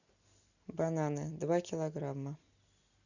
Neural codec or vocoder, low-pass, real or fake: none; 7.2 kHz; real